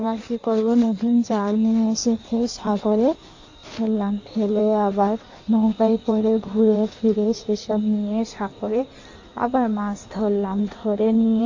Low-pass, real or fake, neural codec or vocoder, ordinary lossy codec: 7.2 kHz; fake; codec, 16 kHz in and 24 kHz out, 1.1 kbps, FireRedTTS-2 codec; AAC, 48 kbps